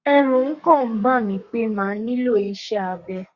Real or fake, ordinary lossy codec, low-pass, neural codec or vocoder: fake; Opus, 64 kbps; 7.2 kHz; codec, 32 kHz, 1.9 kbps, SNAC